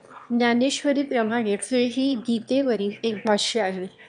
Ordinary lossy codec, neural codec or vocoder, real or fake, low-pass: MP3, 96 kbps; autoencoder, 22.05 kHz, a latent of 192 numbers a frame, VITS, trained on one speaker; fake; 9.9 kHz